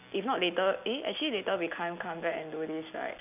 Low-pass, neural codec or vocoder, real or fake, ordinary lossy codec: 3.6 kHz; none; real; none